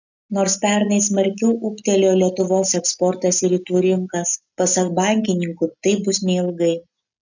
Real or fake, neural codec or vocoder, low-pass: real; none; 7.2 kHz